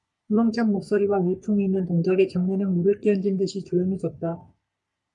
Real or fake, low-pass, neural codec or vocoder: fake; 10.8 kHz; codec, 44.1 kHz, 3.4 kbps, Pupu-Codec